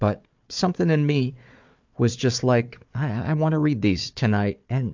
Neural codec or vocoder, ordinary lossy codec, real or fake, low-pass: codec, 16 kHz, 4 kbps, FunCodec, trained on Chinese and English, 50 frames a second; MP3, 64 kbps; fake; 7.2 kHz